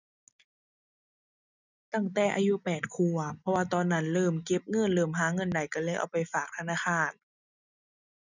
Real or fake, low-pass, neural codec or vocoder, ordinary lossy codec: real; 7.2 kHz; none; none